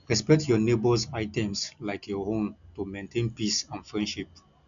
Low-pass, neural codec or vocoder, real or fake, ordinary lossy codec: 7.2 kHz; none; real; none